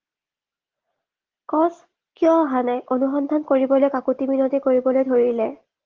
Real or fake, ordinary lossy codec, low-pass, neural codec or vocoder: real; Opus, 16 kbps; 7.2 kHz; none